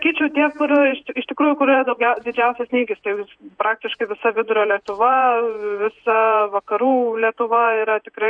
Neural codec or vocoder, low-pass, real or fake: vocoder, 48 kHz, 128 mel bands, Vocos; 9.9 kHz; fake